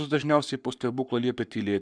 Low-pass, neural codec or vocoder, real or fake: 9.9 kHz; codec, 44.1 kHz, 7.8 kbps, Pupu-Codec; fake